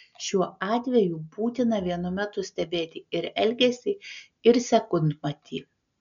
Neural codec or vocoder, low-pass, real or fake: none; 7.2 kHz; real